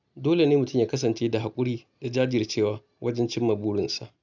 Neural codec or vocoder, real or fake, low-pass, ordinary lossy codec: none; real; 7.2 kHz; none